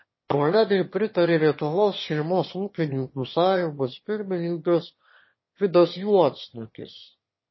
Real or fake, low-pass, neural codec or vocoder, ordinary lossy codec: fake; 7.2 kHz; autoencoder, 22.05 kHz, a latent of 192 numbers a frame, VITS, trained on one speaker; MP3, 24 kbps